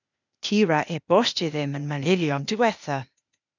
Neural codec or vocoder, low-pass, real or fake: codec, 16 kHz, 0.8 kbps, ZipCodec; 7.2 kHz; fake